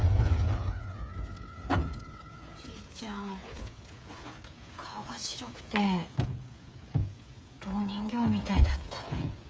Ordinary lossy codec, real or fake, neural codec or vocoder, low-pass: none; fake; codec, 16 kHz, 8 kbps, FreqCodec, smaller model; none